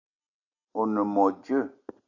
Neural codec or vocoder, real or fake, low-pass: none; real; 7.2 kHz